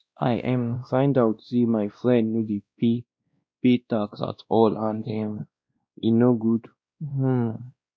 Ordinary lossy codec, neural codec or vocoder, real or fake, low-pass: none; codec, 16 kHz, 1 kbps, X-Codec, WavLM features, trained on Multilingual LibriSpeech; fake; none